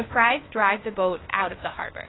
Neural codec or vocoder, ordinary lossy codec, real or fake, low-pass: codec, 16 kHz, 0.8 kbps, ZipCodec; AAC, 16 kbps; fake; 7.2 kHz